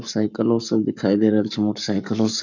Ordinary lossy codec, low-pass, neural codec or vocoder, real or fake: none; 7.2 kHz; codec, 44.1 kHz, 7.8 kbps, Pupu-Codec; fake